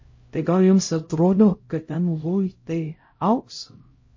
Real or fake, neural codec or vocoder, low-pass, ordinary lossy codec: fake; codec, 16 kHz, 0.5 kbps, X-Codec, HuBERT features, trained on LibriSpeech; 7.2 kHz; MP3, 32 kbps